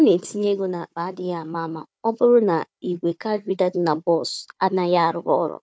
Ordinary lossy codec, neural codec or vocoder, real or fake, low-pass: none; codec, 16 kHz, 4 kbps, FunCodec, trained on Chinese and English, 50 frames a second; fake; none